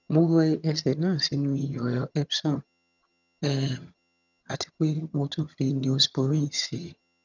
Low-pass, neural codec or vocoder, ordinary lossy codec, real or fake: 7.2 kHz; vocoder, 22.05 kHz, 80 mel bands, HiFi-GAN; none; fake